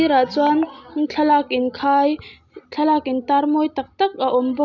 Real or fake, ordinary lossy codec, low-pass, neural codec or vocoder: real; none; 7.2 kHz; none